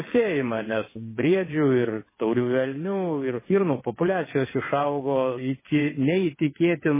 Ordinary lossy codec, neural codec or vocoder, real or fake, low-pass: MP3, 16 kbps; vocoder, 22.05 kHz, 80 mel bands, WaveNeXt; fake; 3.6 kHz